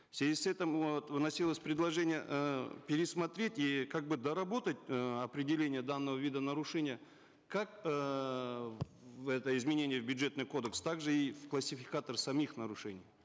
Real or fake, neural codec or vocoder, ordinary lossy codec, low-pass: real; none; none; none